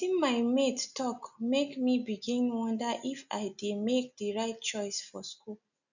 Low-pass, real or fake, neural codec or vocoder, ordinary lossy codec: 7.2 kHz; real; none; none